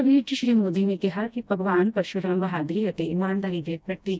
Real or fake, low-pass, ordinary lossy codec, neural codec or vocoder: fake; none; none; codec, 16 kHz, 1 kbps, FreqCodec, smaller model